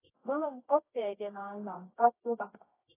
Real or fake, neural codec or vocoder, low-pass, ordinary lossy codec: fake; codec, 24 kHz, 0.9 kbps, WavTokenizer, medium music audio release; 3.6 kHz; AAC, 16 kbps